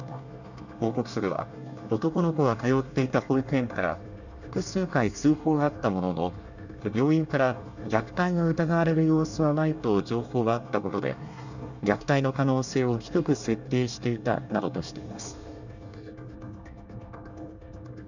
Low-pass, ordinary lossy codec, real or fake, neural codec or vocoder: 7.2 kHz; none; fake; codec, 24 kHz, 1 kbps, SNAC